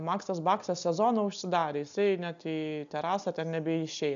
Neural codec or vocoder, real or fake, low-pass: none; real; 7.2 kHz